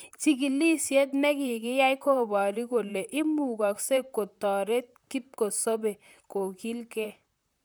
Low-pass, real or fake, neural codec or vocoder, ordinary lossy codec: none; fake; vocoder, 44.1 kHz, 128 mel bands, Pupu-Vocoder; none